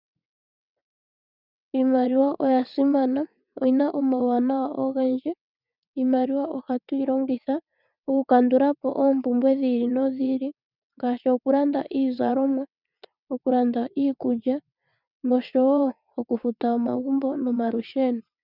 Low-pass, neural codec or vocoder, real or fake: 5.4 kHz; vocoder, 22.05 kHz, 80 mel bands, WaveNeXt; fake